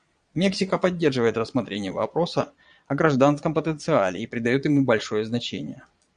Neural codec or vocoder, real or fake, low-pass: vocoder, 22.05 kHz, 80 mel bands, Vocos; fake; 9.9 kHz